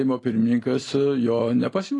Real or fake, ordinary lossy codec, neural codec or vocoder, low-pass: real; AAC, 32 kbps; none; 10.8 kHz